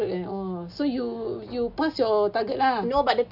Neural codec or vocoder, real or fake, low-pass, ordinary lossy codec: none; real; 5.4 kHz; none